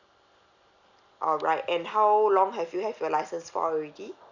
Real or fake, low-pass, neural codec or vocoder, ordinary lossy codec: real; 7.2 kHz; none; none